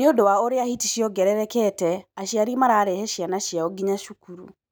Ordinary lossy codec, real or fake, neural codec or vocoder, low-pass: none; real; none; none